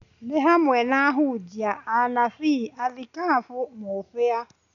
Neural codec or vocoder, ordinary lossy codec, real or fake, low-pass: none; none; real; 7.2 kHz